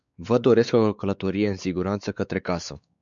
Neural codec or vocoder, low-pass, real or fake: codec, 16 kHz, 4 kbps, X-Codec, WavLM features, trained on Multilingual LibriSpeech; 7.2 kHz; fake